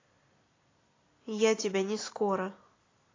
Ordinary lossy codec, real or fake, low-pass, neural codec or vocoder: AAC, 32 kbps; real; 7.2 kHz; none